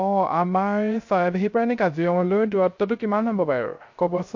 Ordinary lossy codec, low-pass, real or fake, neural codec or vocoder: MP3, 48 kbps; 7.2 kHz; fake; codec, 16 kHz, 0.3 kbps, FocalCodec